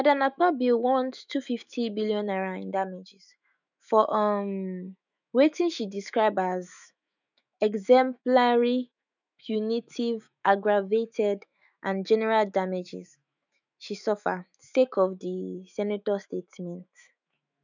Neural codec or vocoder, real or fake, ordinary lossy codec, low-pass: autoencoder, 48 kHz, 128 numbers a frame, DAC-VAE, trained on Japanese speech; fake; none; 7.2 kHz